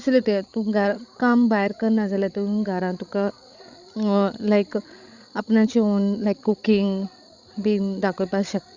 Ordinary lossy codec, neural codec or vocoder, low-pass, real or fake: Opus, 64 kbps; codec, 16 kHz, 16 kbps, FreqCodec, larger model; 7.2 kHz; fake